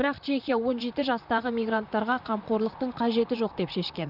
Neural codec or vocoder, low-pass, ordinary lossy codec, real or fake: vocoder, 44.1 kHz, 128 mel bands, Pupu-Vocoder; 5.4 kHz; none; fake